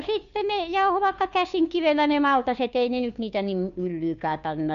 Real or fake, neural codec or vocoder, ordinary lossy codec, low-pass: fake; codec, 16 kHz, 2 kbps, FunCodec, trained on Chinese and English, 25 frames a second; none; 7.2 kHz